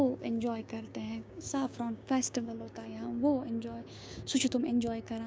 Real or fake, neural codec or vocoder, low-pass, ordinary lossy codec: fake; codec, 16 kHz, 6 kbps, DAC; none; none